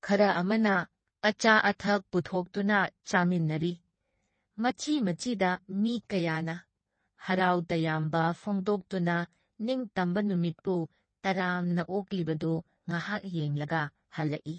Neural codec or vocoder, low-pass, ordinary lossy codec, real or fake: codec, 16 kHz in and 24 kHz out, 1.1 kbps, FireRedTTS-2 codec; 9.9 kHz; MP3, 32 kbps; fake